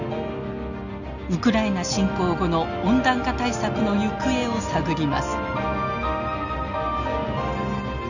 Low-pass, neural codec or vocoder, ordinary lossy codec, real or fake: 7.2 kHz; none; none; real